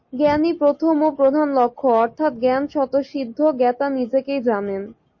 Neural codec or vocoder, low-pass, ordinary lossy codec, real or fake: none; 7.2 kHz; MP3, 32 kbps; real